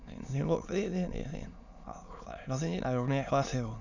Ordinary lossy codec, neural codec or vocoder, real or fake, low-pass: none; autoencoder, 22.05 kHz, a latent of 192 numbers a frame, VITS, trained on many speakers; fake; 7.2 kHz